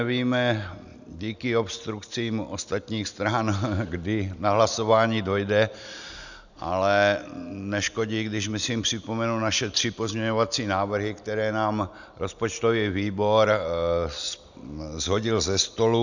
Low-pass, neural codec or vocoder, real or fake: 7.2 kHz; none; real